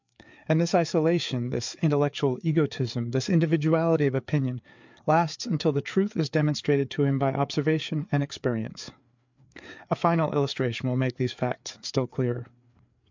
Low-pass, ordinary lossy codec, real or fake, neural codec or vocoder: 7.2 kHz; MP3, 64 kbps; fake; codec, 16 kHz, 4 kbps, FreqCodec, larger model